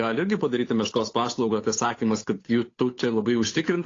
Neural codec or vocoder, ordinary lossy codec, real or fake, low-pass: codec, 16 kHz, 8 kbps, FunCodec, trained on Chinese and English, 25 frames a second; AAC, 32 kbps; fake; 7.2 kHz